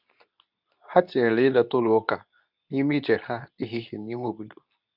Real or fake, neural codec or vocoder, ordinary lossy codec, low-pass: fake; codec, 24 kHz, 0.9 kbps, WavTokenizer, medium speech release version 2; none; 5.4 kHz